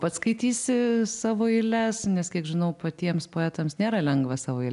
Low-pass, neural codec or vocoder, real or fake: 10.8 kHz; none; real